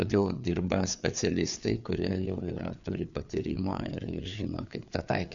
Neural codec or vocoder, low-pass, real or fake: codec, 16 kHz, 4 kbps, FreqCodec, larger model; 7.2 kHz; fake